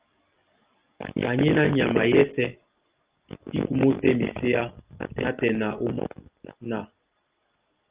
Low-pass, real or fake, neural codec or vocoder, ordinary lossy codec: 3.6 kHz; real; none; Opus, 32 kbps